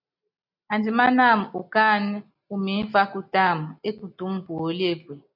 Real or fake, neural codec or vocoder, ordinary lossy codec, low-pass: real; none; AAC, 48 kbps; 5.4 kHz